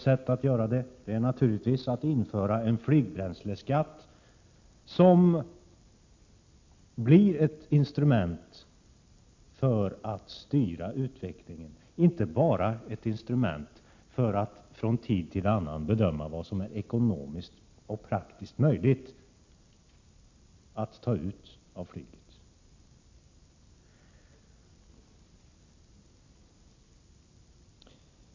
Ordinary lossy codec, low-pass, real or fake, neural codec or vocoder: MP3, 48 kbps; 7.2 kHz; real; none